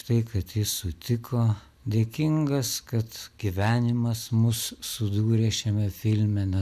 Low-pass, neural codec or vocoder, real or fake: 14.4 kHz; none; real